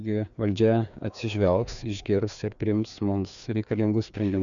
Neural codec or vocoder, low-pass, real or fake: codec, 16 kHz, 2 kbps, FreqCodec, larger model; 7.2 kHz; fake